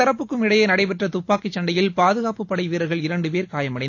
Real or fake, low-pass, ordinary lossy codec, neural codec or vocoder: real; 7.2 kHz; AAC, 48 kbps; none